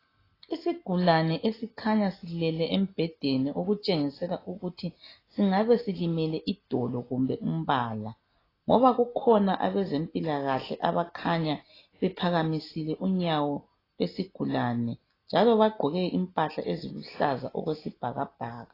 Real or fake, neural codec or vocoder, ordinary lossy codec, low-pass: real; none; AAC, 24 kbps; 5.4 kHz